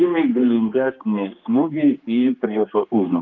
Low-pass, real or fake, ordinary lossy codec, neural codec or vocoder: 7.2 kHz; fake; Opus, 24 kbps; codec, 16 kHz, 2 kbps, X-Codec, HuBERT features, trained on general audio